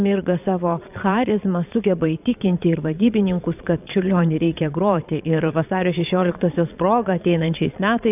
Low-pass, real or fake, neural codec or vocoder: 3.6 kHz; fake; vocoder, 22.05 kHz, 80 mel bands, Vocos